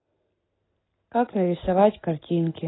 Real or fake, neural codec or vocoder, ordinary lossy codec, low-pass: real; none; AAC, 16 kbps; 7.2 kHz